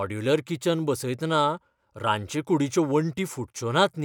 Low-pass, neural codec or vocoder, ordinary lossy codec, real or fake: 19.8 kHz; none; none; real